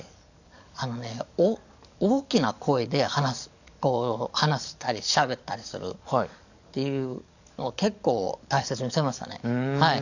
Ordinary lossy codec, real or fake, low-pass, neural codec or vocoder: none; fake; 7.2 kHz; codec, 44.1 kHz, 7.8 kbps, DAC